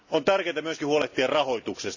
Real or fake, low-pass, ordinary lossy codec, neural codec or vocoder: real; 7.2 kHz; none; none